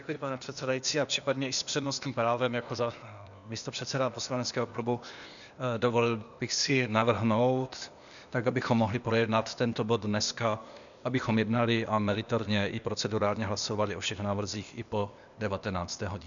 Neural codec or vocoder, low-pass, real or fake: codec, 16 kHz, 0.8 kbps, ZipCodec; 7.2 kHz; fake